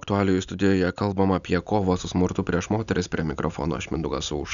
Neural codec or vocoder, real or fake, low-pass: none; real; 7.2 kHz